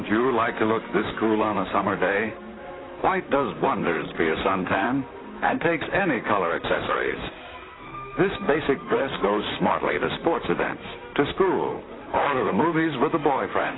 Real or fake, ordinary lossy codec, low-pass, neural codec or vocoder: fake; AAC, 16 kbps; 7.2 kHz; vocoder, 44.1 kHz, 128 mel bands, Pupu-Vocoder